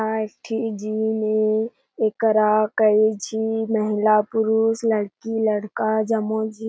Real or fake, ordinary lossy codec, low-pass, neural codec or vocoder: real; none; none; none